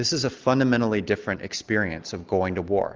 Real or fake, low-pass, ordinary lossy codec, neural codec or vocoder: real; 7.2 kHz; Opus, 32 kbps; none